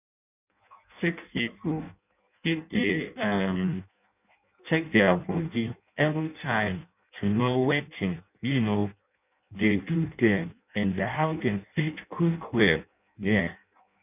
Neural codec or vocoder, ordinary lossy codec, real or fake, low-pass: codec, 16 kHz in and 24 kHz out, 0.6 kbps, FireRedTTS-2 codec; none; fake; 3.6 kHz